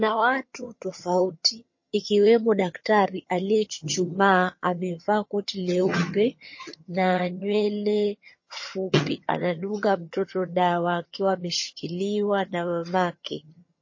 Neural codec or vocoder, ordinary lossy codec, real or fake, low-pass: vocoder, 22.05 kHz, 80 mel bands, HiFi-GAN; MP3, 32 kbps; fake; 7.2 kHz